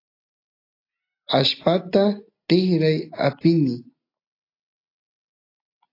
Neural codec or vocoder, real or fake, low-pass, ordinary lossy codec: none; real; 5.4 kHz; AAC, 32 kbps